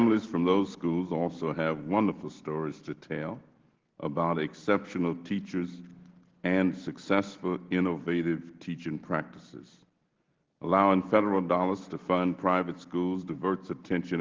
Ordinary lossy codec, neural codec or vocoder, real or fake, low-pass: Opus, 16 kbps; none; real; 7.2 kHz